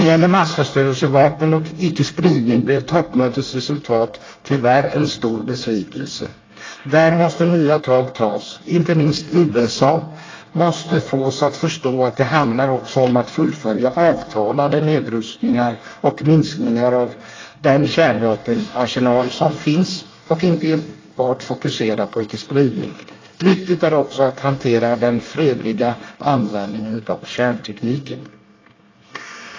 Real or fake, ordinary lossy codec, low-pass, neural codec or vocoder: fake; AAC, 32 kbps; 7.2 kHz; codec, 24 kHz, 1 kbps, SNAC